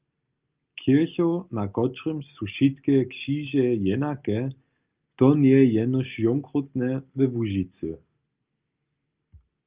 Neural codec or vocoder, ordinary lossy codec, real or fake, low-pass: none; Opus, 24 kbps; real; 3.6 kHz